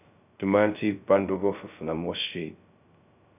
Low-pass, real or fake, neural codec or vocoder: 3.6 kHz; fake; codec, 16 kHz, 0.2 kbps, FocalCodec